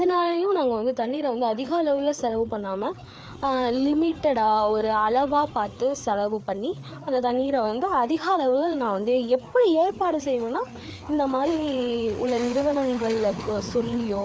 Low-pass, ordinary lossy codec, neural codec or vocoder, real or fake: none; none; codec, 16 kHz, 4 kbps, FreqCodec, larger model; fake